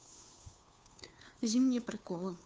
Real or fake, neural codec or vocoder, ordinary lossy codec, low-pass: fake; codec, 16 kHz, 2 kbps, FunCodec, trained on Chinese and English, 25 frames a second; none; none